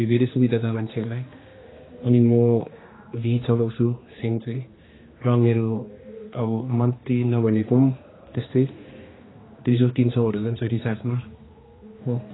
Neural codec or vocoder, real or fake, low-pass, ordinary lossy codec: codec, 16 kHz, 2 kbps, X-Codec, HuBERT features, trained on general audio; fake; 7.2 kHz; AAC, 16 kbps